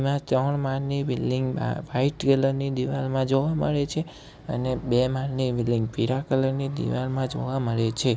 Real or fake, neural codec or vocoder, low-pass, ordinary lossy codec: real; none; none; none